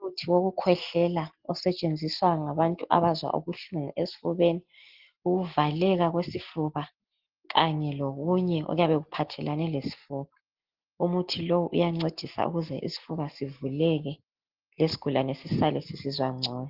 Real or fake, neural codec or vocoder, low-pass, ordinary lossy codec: real; none; 5.4 kHz; Opus, 16 kbps